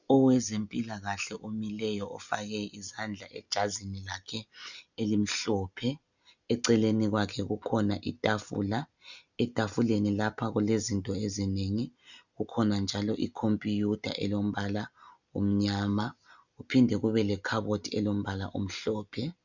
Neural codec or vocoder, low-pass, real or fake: none; 7.2 kHz; real